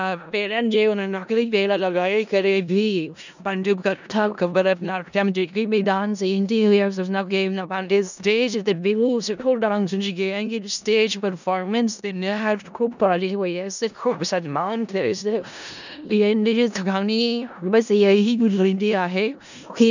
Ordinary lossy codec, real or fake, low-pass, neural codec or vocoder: none; fake; 7.2 kHz; codec, 16 kHz in and 24 kHz out, 0.4 kbps, LongCat-Audio-Codec, four codebook decoder